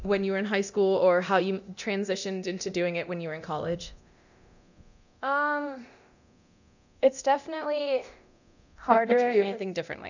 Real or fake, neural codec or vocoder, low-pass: fake; codec, 24 kHz, 0.9 kbps, DualCodec; 7.2 kHz